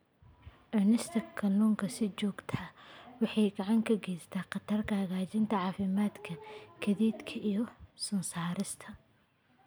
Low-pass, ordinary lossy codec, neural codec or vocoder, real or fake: none; none; none; real